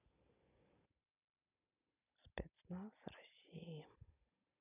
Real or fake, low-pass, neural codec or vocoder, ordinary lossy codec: real; 3.6 kHz; none; none